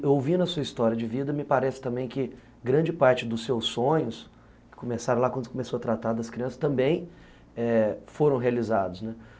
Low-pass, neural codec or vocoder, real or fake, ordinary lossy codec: none; none; real; none